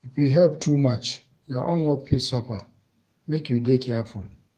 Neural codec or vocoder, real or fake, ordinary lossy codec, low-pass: codec, 32 kHz, 1.9 kbps, SNAC; fake; Opus, 32 kbps; 14.4 kHz